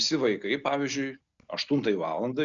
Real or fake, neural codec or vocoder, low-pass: real; none; 7.2 kHz